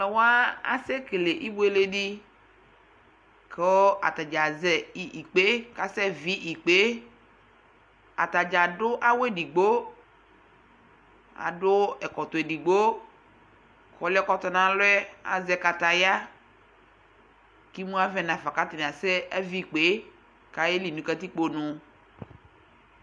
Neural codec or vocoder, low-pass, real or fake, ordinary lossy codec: none; 9.9 kHz; real; MP3, 64 kbps